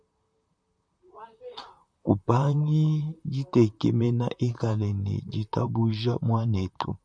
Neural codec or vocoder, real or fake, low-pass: vocoder, 44.1 kHz, 128 mel bands, Pupu-Vocoder; fake; 9.9 kHz